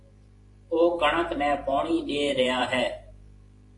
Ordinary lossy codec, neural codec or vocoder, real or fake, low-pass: AAC, 48 kbps; vocoder, 44.1 kHz, 128 mel bands every 512 samples, BigVGAN v2; fake; 10.8 kHz